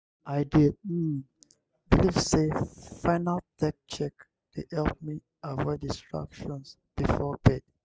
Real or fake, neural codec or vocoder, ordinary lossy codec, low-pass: real; none; none; none